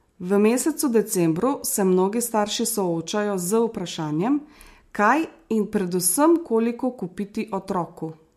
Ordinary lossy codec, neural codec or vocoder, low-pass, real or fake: MP3, 64 kbps; none; 14.4 kHz; real